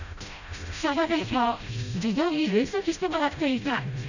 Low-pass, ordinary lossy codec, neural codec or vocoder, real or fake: 7.2 kHz; none; codec, 16 kHz, 0.5 kbps, FreqCodec, smaller model; fake